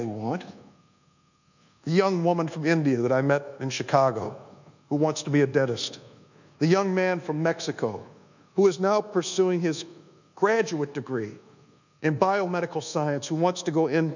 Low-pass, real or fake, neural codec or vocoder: 7.2 kHz; fake; codec, 24 kHz, 1.2 kbps, DualCodec